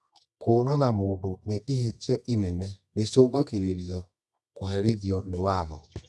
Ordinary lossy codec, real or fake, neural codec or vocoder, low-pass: none; fake; codec, 24 kHz, 0.9 kbps, WavTokenizer, medium music audio release; none